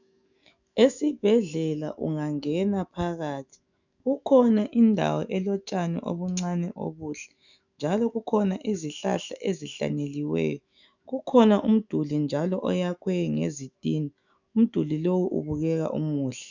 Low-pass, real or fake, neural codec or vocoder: 7.2 kHz; fake; autoencoder, 48 kHz, 128 numbers a frame, DAC-VAE, trained on Japanese speech